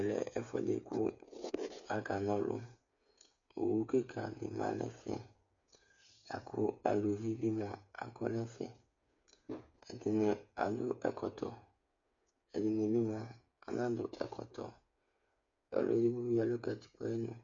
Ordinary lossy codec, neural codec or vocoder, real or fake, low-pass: MP3, 48 kbps; codec, 16 kHz, 8 kbps, FreqCodec, smaller model; fake; 7.2 kHz